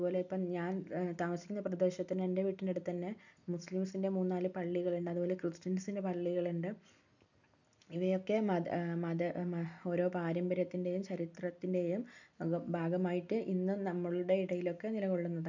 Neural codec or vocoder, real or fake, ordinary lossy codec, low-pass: none; real; none; 7.2 kHz